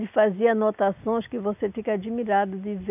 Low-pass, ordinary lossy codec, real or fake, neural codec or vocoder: 3.6 kHz; none; real; none